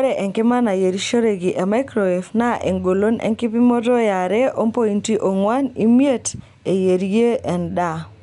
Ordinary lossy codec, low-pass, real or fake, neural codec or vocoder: none; 10.8 kHz; real; none